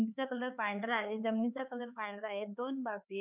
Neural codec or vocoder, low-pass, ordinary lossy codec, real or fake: codec, 16 kHz, 4 kbps, X-Codec, HuBERT features, trained on LibriSpeech; 3.6 kHz; none; fake